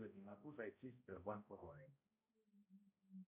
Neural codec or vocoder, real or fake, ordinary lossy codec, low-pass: codec, 16 kHz, 0.5 kbps, X-Codec, HuBERT features, trained on balanced general audio; fake; MP3, 32 kbps; 3.6 kHz